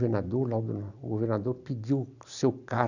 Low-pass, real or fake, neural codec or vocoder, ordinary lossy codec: 7.2 kHz; real; none; none